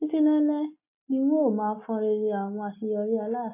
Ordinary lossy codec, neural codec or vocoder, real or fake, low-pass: MP3, 24 kbps; none; real; 3.6 kHz